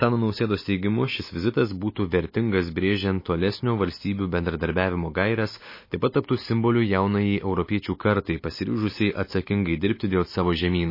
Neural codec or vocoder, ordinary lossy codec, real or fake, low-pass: none; MP3, 24 kbps; real; 5.4 kHz